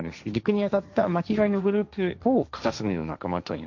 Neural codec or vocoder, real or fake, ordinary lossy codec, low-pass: codec, 16 kHz, 1.1 kbps, Voila-Tokenizer; fake; none; 7.2 kHz